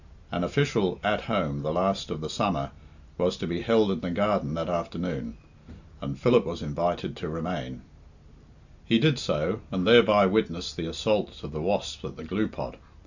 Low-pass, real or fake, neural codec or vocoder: 7.2 kHz; real; none